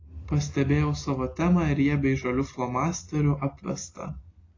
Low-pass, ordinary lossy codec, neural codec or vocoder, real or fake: 7.2 kHz; AAC, 32 kbps; none; real